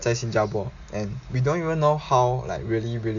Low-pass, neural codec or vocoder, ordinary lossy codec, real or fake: 7.2 kHz; none; AAC, 64 kbps; real